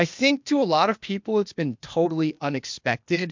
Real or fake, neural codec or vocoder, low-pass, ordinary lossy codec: fake; codec, 16 kHz, 0.8 kbps, ZipCodec; 7.2 kHz; MP3, 64 kbps